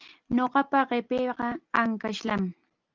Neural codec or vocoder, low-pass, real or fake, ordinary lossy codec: none; 7.2 kHz; real; Opus, 32 kbps